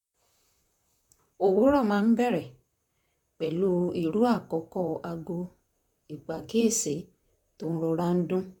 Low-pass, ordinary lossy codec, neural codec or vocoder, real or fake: 19.8 kHz; none; vocoder, 44.1 kHz, 128 mel bands, Pupu-Vocoder; fake